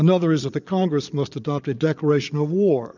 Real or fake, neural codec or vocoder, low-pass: fake; vocoder, 22.05 kHz, 80 mel bands, Vocos; 7.2 kHz